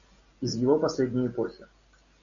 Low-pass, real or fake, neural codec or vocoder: 7.2 kHz; real; none